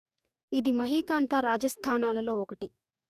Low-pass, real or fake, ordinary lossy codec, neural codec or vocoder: 14.4 kHz; fake; none; codec, 44.1 kHz, 2.6 kbps, DAC